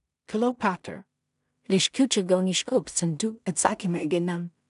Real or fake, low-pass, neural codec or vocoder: fake; 10.8 kHz; codec, 16 kHz in and 24 kHz out, 0.4 kbps, LongCat-Audio-Codec, two codebook decoder